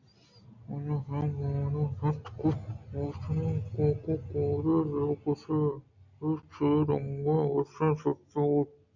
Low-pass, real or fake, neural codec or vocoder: 7.2 kHz; real; none